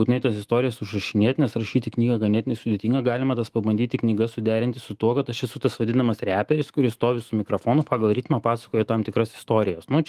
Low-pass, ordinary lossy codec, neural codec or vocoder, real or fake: 14.4 kHz; Opus, 24 kbps; autoencoder, 48 kHz, 128 numbers a frame, DAC-VAE, trained on Japanese speech; fake